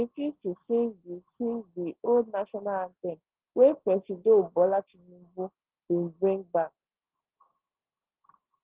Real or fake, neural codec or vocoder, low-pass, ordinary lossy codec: real; none; 3.6 kHz; Opus, 16 kbps